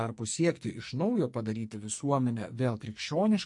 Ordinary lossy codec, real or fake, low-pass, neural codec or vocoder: MP3, 48 kbps; fake; 10.8 kHz; codec, 44.1 kHz, 2.6 kbps, SNAC